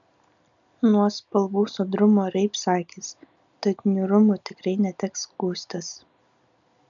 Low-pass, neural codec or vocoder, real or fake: 7.2 kHz; none; real